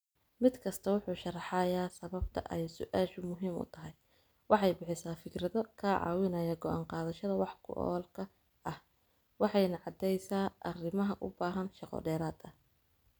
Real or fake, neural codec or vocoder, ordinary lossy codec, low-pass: real; none; none; none